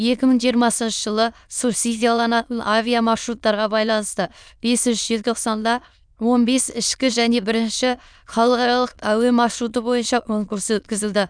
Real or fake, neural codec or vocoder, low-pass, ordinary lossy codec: fake; autoencoder, 22.05 kHz, a latent of 192 numbers a frame, VITS, trained on many speakers; 9.9 kHz; none